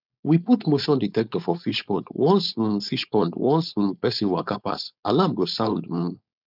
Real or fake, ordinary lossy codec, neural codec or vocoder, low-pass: fake; none; codec, 16 kHz, 4.8 kbps, FACodec; 5.4 kHz